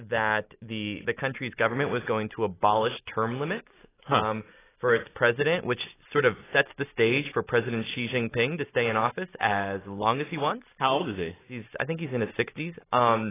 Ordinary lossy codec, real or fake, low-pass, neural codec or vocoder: AAC, 16 kbps; real; 3.6 kHz; none